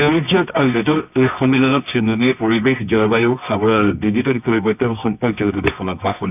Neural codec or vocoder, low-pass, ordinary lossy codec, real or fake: codec, 24 kHz, 0.9 kbps, WavTokenizer, medium music audio release; 3.6 kHz; none; fake